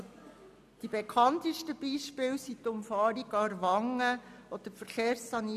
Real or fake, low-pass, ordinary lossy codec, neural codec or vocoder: real; 14.4 kHz; none; none